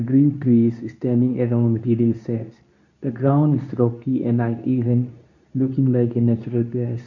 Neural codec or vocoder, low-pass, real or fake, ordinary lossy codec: codec, 24 kHz, 0.9 kbps, WavTokenizer, medium speech release version 2; 7.2 kHz; fake; none